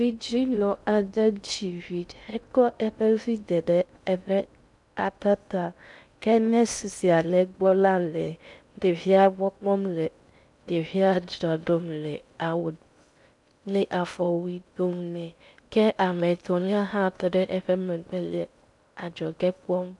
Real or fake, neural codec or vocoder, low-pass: fake; codec, 16 kHz in and 24 kHz out, 0.6 kbps, FocalCodec, streaming, 2048 codes; 10.8 kHz